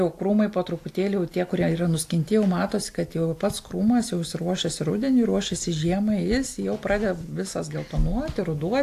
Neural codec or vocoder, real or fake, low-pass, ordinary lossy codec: none; real; 14.4 kHz; AAC, 64 kbps